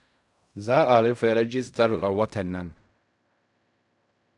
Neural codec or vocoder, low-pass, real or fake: codec, 16 kHz in and 24 kHz out, 0.4 kbps, LongCat-Audio-Codec, fine tuned four codebook decoder; 10.8 kHz; fake